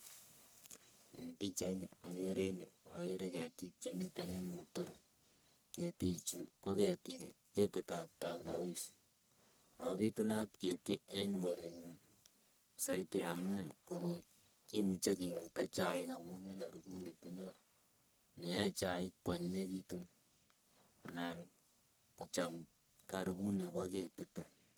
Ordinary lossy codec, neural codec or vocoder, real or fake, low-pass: none; codec, 44.1 kHz, 1.7 kbps, Pupu-Codec; fake; none